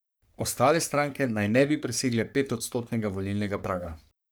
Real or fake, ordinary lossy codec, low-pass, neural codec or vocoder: fake; none; none; codec, 44.1 kHz, 3.4 kbps, Pupu-Codec